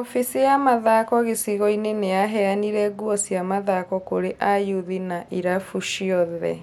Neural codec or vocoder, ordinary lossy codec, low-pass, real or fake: none; none; 19.8 kHz; real